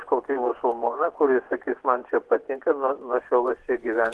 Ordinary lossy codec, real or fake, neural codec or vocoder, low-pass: Opus, 16 kbps; fake; vocoder, 24 kHz, 100 mel bands, Vocos; 10.8 kHz